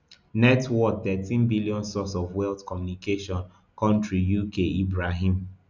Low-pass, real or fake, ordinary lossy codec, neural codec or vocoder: 7.2 kHz; real; none; none